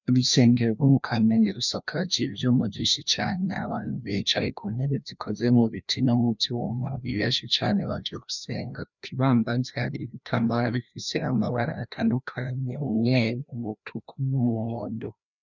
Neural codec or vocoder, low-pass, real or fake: codec, 16 kHz, 1 kbps, FreqCodec, larger model; 7.2 kHz; fake